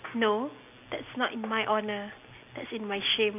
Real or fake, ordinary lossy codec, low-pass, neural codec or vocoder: real; none; 3.6 kHz; none